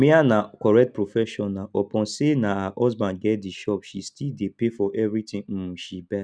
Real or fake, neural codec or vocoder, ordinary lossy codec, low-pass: real; none; none; none